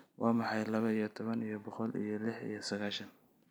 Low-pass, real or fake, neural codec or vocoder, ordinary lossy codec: none; real; none; none